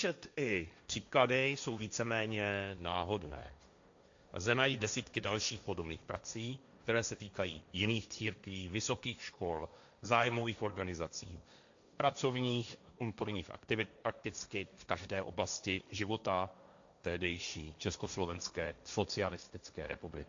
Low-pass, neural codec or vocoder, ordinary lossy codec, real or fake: 7.2 kHz; codec, 16 kHz, 1.1 kbps, Voila-Tokenizer; AAC, 64 kbps; fake